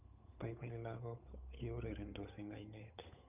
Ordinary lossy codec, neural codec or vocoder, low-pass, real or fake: none; codec, 16 kHz, 8 kbps, FunCodec, trained on Chinese and English, 25 frames a second; 3.6 kHz; fake